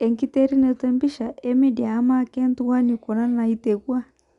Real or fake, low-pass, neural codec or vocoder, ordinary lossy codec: real; 10.8 kHz; none; Opus, 64 kbps